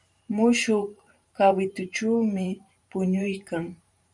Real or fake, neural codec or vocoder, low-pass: fake; vocoder, 44.1 kHz, 128 mel bands every 512 samples, BigVGAN v2; 10.8 kHz